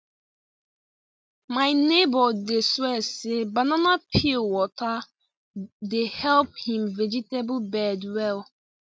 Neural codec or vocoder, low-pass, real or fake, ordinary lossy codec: none; none; real; none